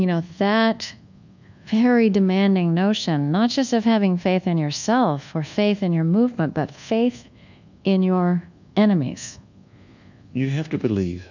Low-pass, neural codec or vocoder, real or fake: 7.2 kHz; codec, 24 kHz, 1.2 kbps, DualCodec; fake